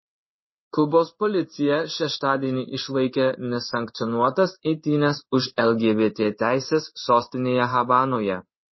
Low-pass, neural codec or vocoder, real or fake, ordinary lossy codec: 7.2 kHz; codec, 16 kHz in and 24 kHz out, 1 kbps, XY-Tokenizer; fake; MP3, 24 kbps